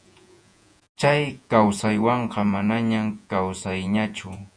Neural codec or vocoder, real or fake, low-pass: vocoder, 48 kHz, 128 mel bands, Vocos; fake; 9.9 kHz